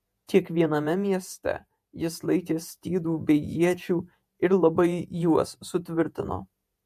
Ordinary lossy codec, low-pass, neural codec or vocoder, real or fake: MP3, 64 kbps; 14.4 kHz; vocoder, 48 kHz, 128 mel bands, Vocos; fake